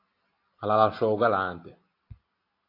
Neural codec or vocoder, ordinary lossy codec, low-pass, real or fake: none; AAC, 32 kbps; 5.4 kHz; real